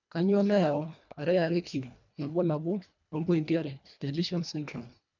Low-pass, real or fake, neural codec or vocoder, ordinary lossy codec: 7.2 kHz; fake; codec, 24 kHz, 1.5 kbps, HILCodec; none